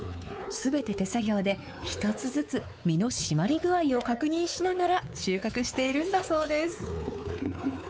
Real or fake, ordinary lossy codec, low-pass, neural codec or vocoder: fake; none; none; codec, 16 kHz, 4 kbps, X-Codec, WavLM features, trained on Multilingual LibriSpeech